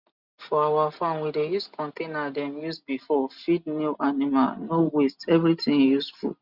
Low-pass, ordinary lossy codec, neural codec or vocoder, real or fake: 5.4 kHz; Opus, 64 kbps; none; real